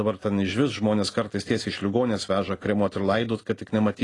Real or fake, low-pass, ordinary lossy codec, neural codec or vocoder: real; 10.8 kHz; AAC, 32 kbps; none